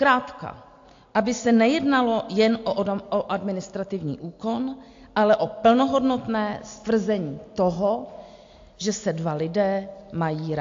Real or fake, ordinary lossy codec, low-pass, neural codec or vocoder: real; AAC, 48 kbps; 7.2 kHz; none